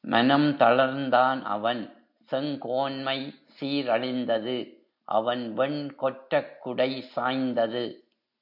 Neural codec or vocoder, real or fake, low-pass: none; real; 5.4 kHz